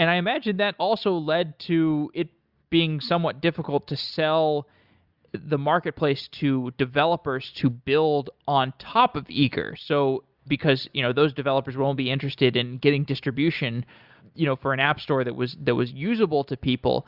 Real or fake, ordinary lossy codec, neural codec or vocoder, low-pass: real; Opus, 64 kbps; none; 5.4 kHz